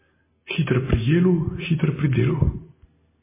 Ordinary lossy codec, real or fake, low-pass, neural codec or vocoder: MP3, 16 kbps; real; 3.6 kHz; none